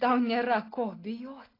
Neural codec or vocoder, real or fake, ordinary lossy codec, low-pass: none; real; AAC, 24 kbps; 5.4 kHz